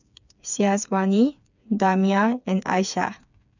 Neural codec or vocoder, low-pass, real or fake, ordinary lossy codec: codec, 16 kHz, 4 kbps, FreqCodec, smaller model; 7.2 kHz; fake; none